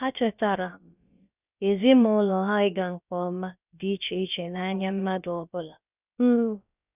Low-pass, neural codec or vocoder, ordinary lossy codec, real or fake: 3.6 kHz; codec, 16 kHz, about 1 kbps, DyCAST, with the encoder's durations; none; fake